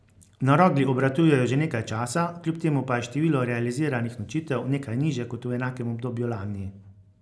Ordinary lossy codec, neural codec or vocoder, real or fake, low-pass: none; none; real; none